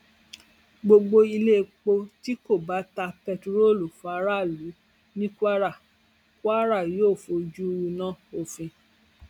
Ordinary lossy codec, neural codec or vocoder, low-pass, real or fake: none; none; 19.8 kHz; real